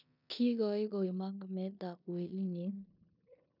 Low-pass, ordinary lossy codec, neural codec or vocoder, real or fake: 5.4 kHz; none; codec, 16 kHz in and 24 kHz out, 0.9 kbps, LongCat-Audio-Codec, four codebook decoder; fake